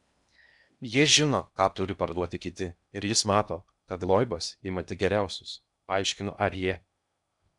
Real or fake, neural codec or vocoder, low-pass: fake; codec, 16 kHz in and 24 kHz out, 0.8 kbps, FocalCodec, streaming, 65536 codes; 10.8 kHz